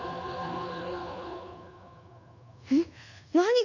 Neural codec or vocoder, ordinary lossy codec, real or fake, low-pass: codec, 24 kHz, 1.2 kbps, DualCodec; none; fake; 7.2 kHz